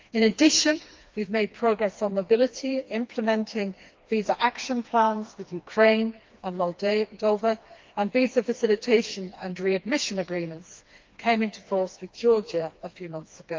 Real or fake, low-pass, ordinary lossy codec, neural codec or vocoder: fake; 7.2 kHz; Opus, 32 kbps; codec, 16 kHz, 2 kbps, FreqCodec, smaller model